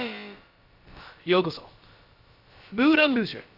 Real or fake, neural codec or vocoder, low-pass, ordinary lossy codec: fake; codec, 16 kHz, about 1 kbps, DyCAST, with the encoder's durations; 5.4 kHz; none